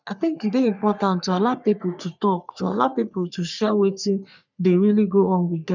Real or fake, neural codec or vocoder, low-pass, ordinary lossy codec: fake; codec, 44.1 kHz, 3.4 kbps, Pupu-Codec; 7.2 kHz; none